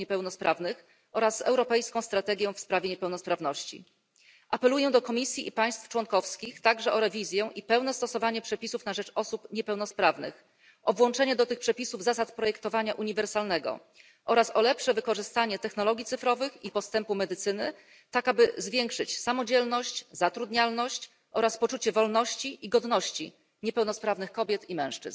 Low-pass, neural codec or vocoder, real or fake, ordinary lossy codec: none; none; real; none